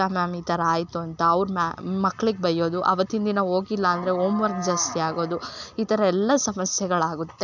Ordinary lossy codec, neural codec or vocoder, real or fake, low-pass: none; none; real; 7.2 kHz